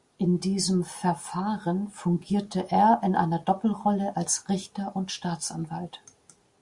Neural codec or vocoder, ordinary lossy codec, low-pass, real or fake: none; Opus, 64 kbps; 10.8 kHz; real